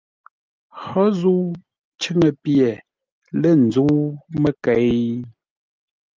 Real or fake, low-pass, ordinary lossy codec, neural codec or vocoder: real; 7.2 kHz; Opus, 32 kbps; none